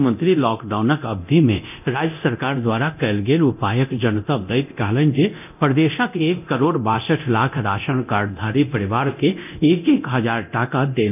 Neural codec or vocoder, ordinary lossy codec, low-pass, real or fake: codec, 24 kHz, 0.9 kbps, DualCodec; none; 3.6 kHz; fake